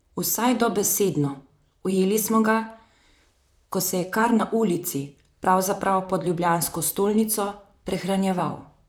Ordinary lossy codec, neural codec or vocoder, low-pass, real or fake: none; vocoder, 44.1 kHz, 128 mel bands, Pupu-Vocoder; none; fake